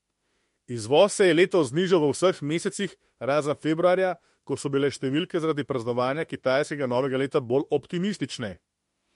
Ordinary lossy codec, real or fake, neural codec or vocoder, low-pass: MP3, 48 kbps; fake; autoencoder, 48 kHz, 32 numbers a frame, DAC-VAE, trained on Japanese speech; 14.4 kHz